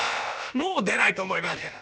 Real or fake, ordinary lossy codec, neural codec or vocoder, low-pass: fake; none; codec, 16 kHz, about 1 kbps, DyCAST, with the encoder's durations; none